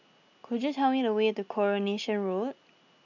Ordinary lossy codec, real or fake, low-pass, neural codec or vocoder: none; real; 7.2 kHz; none